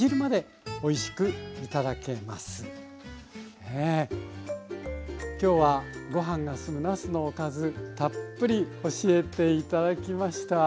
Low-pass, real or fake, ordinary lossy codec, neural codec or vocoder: none; real; none; none